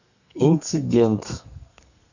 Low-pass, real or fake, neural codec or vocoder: 7.2 kHz; fake; codec, 32 kHz, 1.9 kbps, SNAC